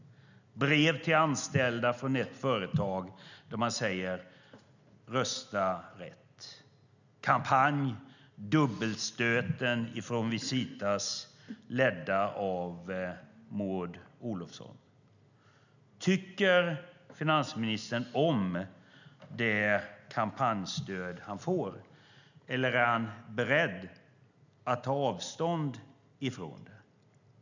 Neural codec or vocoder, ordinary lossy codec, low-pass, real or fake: none; none; 7.2 kHz; real